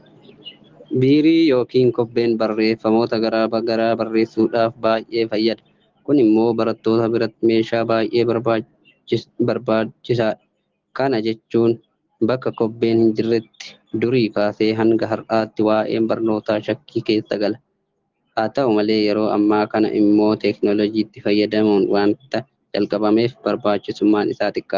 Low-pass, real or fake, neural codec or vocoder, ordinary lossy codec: 7.2 kHz; real; none; Opus, 16 kbps